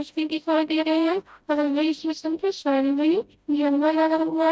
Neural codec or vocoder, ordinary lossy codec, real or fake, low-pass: codec, 16 kHz, 0.5 kbps, FreqCodec, smaller model; none; fake; none